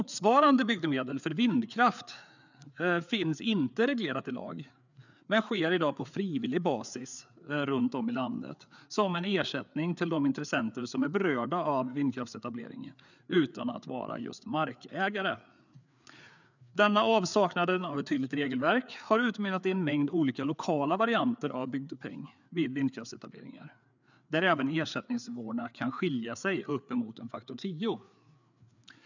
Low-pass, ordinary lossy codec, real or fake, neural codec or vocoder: 7.2 kHz; none; fake; codec, 16 kHz, 4 kbps, FreqCodec, larger model